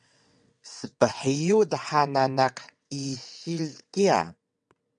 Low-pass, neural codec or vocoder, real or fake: 9.9 kHz; vocoder, 22.05 kHz, 80 mel bands, WaveNeXt; fake